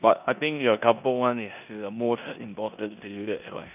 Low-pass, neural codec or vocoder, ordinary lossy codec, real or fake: 3.6 kHz; codec, 16 kHz in and 24 kHz out, 0.9 kbps, LongCat-Audio-Codec, four codebook decoder; none; fake